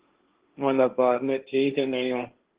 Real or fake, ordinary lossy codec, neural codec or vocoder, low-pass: fake; Opus, 16 kbps; codec, 16 kHz, 1.1 kbps, Voila-Tokenizer; 3.6 kHz